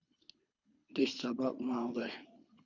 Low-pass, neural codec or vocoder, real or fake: 7.2 kHz; codec, 24 kHz, 3 kbps, HILCodec; fake